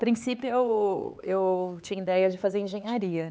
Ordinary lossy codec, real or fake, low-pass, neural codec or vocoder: none; fake; none; codec, 16 kHz, 4 kbps, X-Codec, HuBERT features, trained on LibriSpeech